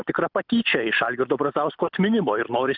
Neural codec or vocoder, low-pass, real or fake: none; 5.4 kHz; real